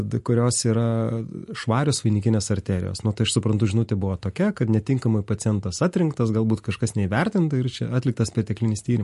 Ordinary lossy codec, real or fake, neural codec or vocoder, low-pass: MP3, 48 kbps; real; none; 14.4 kHz